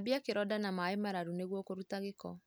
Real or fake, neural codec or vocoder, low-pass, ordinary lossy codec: real; none; none; none